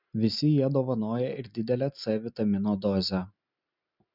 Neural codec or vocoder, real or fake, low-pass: none; real; 5.4 kHz